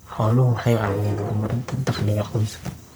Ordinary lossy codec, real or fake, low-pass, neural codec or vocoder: none; fake; none; codec, 44.1 kHz, 1.7 kbps, Pupu-Codec